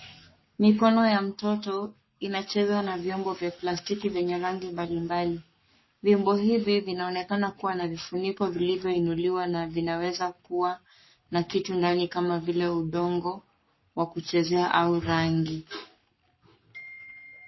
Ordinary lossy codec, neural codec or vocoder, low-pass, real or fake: MP3, 24 kbps; codec, 44.1 kHz, 7.8 kbps, Pupu-Codec; 7.2 kHz; fake